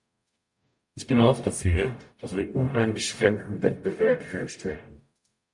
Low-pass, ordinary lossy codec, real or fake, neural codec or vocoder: 10.8 kHz; MP3, 64 kbps; fake; codec, 44.1 kHz, 0.9 kbps, DAC